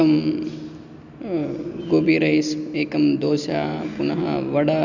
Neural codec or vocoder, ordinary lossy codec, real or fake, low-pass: none; none; real; 7.2 kHz